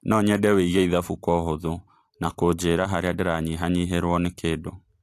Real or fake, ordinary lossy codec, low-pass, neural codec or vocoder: fake; AAC, 64 kbps; 14.4 kHz; vocoder, 44.1 kHz, 128 mel bands every 256 samples, BigVGAN v2